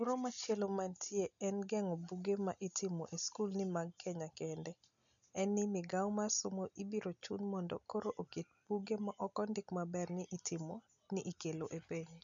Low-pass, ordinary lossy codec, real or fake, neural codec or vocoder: 7.2 kHz; none; real; none